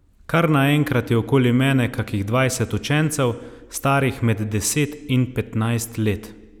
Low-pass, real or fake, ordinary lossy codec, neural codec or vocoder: 19.8 kHz; real; none; none